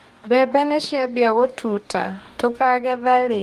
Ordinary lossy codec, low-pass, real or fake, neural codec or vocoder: Opus, 32 kbps; 14.4 kHz; fake; codec, 44.1 kHz, 2.6 kbps, DAC